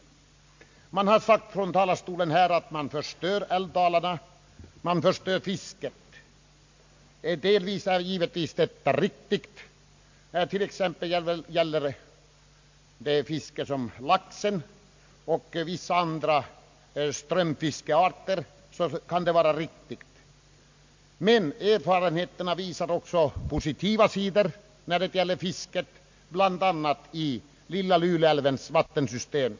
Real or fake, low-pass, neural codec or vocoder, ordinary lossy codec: real; 7.2 kHz; none; MP3, 48 kbps